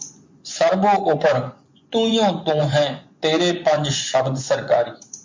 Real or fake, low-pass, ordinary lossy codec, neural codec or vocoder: fake; 7.2 kHz; MP3, 48 kbps; vocoder, 24 kHz, 100 mel bands, Vocos